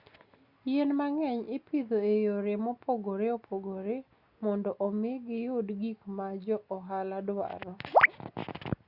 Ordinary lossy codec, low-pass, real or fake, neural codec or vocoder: Opus, 64 kbps; 5.4 kHz; real; none